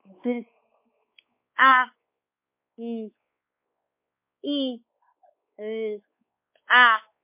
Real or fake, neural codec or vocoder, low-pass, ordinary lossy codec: fake; codec, 16 kHz, 4 kbps, X-Codec, WavLM features, trained on Multilingual LibriSpeech; 3.6 kHz; MP3, 24 kbps